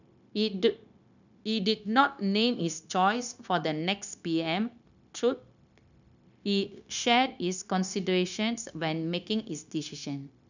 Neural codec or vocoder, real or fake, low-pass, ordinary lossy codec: codec, 16 kHz, 0.9 kbps, LongCat-Audio-Codec; fake; 7.2 kHz; none